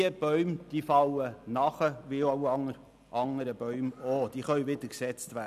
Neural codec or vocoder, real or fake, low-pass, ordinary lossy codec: none; real; 14.4 kHz; none